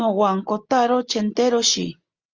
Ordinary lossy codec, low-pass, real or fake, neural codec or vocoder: Opus, 32 kbps; 7.2 kHz; real; none